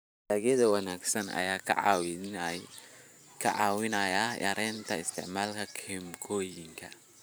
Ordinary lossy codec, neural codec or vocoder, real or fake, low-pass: none; vocoder, 44.1 kHz, 128 mel bands every 512 samples, BigVGAN v2; fake; none